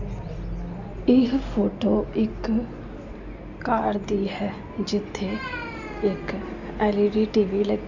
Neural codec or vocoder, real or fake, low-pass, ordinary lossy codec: none; real; 7.2 kHz; none